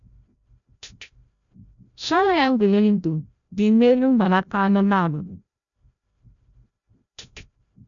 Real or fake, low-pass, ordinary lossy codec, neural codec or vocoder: fake; 7.2 kHz; none; codec, 16 kHz, 0.5 kbps, FreqCodec, larger model